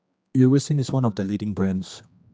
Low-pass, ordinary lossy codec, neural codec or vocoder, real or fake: none; none; codec, 16 kHz, 2 kbps, X-Codec, HuBERT features, trained on general audio; fake